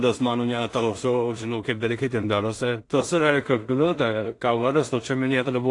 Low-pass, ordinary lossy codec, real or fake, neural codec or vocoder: 10.8 kHz; AAC, 48 kbps; fake; codec, 16 kHz in and 24 kHz out, 0.4 kbps, LongCat-Audio-Codec, two codebook decoder